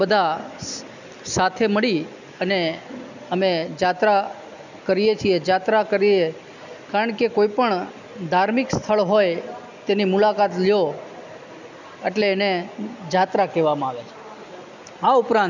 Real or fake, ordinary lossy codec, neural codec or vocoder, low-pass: real; none; none; 7.2 kHz